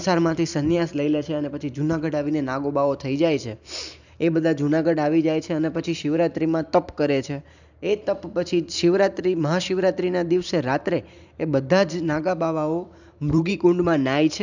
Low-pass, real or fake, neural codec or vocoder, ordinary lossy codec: 7.2 kHz; fake; vocoder, 44.1 kHz, 128 mel bands every 512 samples, BigVGAN v2; none